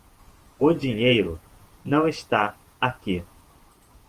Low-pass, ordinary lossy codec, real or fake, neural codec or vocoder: 14.4 kHz; Opus, 24 kbps; fake; vocoder, 48 kHz, 128 mel bands, Vocos